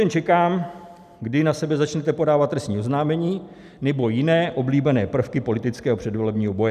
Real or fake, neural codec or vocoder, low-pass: real; none; 14.4 kHz